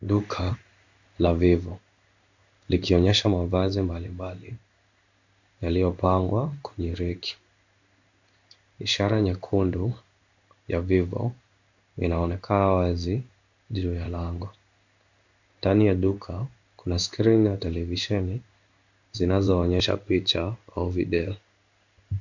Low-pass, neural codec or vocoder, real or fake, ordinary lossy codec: 7.2 kHz; codec, 16 kHz in and 24 kHz out, 1 kbps, XY-Tokenizer; fake; Opus, 64 kbps